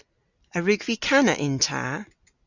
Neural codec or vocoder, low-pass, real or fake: none; 7.2 kHz; real